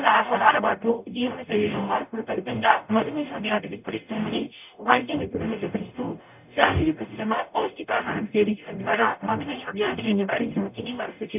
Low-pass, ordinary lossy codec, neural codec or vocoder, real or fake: 3.6 kHz; none; codec, 44.1 kHz, 0.9 kbps, DAC; fake